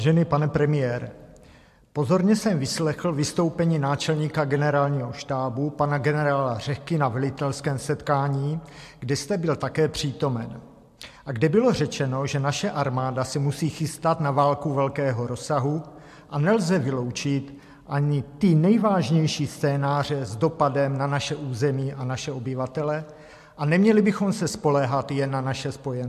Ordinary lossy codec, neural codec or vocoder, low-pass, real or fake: MP3, 64 kbps; none; 14.4 kHz; real